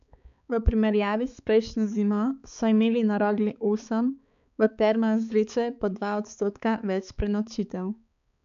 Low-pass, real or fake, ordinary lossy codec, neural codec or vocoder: 7.2 kHz; fake; none; codec, 16 kHz, 4 kbps, X-Codec, HuBERT features, trained on balanced general audio